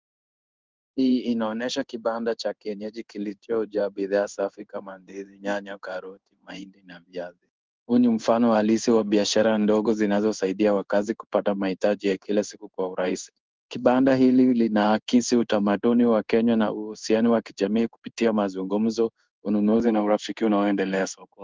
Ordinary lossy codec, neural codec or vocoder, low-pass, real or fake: Opus, 32 kbps; codec, 16 kHz in and 24 kHz out, 1 kbps, XY-Tokenizer; 7.2 kHz; fake